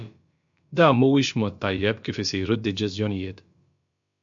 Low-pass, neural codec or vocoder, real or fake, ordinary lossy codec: 7.2 kHz; codec, 16 kHz, about 1 kbps, DyCAST, with the encoder's durations; fake; MP3, 48 kbps